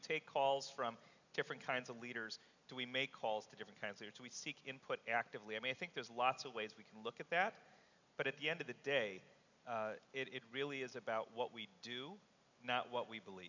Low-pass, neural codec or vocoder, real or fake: 7.2 kHz; none; real